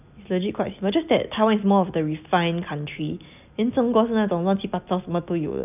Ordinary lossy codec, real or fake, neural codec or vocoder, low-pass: none; real; none; 3.6 kHz